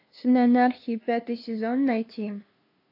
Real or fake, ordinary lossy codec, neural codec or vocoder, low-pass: fake; AAC, 32 kbps; codec, 16 kHz, 2 kbps, FunCodec, trained on LibriTTS, 25 frames a second; 5.4 kHz